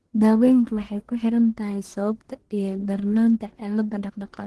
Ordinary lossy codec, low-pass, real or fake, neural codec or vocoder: Opus, 16 kbps; 10.8 kHz; fake; codec, 44.1 kHz, 1.7 kbps, Pupu-Codec